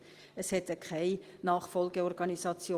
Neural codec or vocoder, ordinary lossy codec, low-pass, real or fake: vocoder, 44.1 kHz, 128 mel bands every 512 samples, BigVGAN v2; Opus, 24 kbps; 14.4 kHz; fake